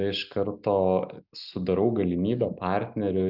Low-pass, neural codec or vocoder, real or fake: 5.4 kHz; none; real